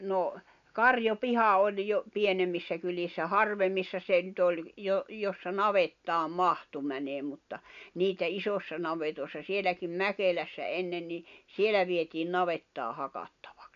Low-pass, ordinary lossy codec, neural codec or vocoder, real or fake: 7.2 kHz; none; none; real